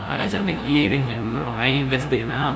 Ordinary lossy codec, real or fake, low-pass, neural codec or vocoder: none; fake; none; codec, 16 kHz, 0.5 kbps, FunCodec, trained on LibriTTS, 25 frames a second